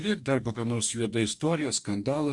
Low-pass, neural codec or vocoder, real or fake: 10.8 kHz; codec, 44.1 kHz, 2.6 kbps, DAC; fake